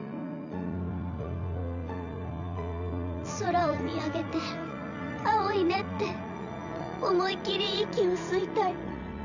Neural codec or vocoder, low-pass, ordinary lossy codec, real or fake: vocoder, 44.1 kHz, 80 mel bands, Vocos; 7.2 kHz; none; fake